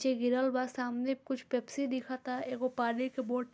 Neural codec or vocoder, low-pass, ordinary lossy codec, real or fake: none; none; none; real